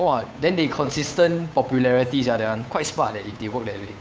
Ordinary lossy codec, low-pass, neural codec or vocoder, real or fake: none; none; codec, 16 kHz, 8 kbps, FunCodec, trained on Chinese and English, 25 frames a second; fake